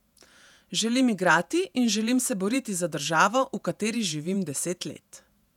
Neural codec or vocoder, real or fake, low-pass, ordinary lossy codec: vocoder, 48 kHz, 128 mel bands, Vocos; fake; 19.8 kHz; none